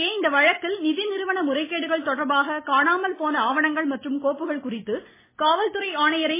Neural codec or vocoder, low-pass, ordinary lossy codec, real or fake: none; 3.6 kHz; MP3, 16 kbps; real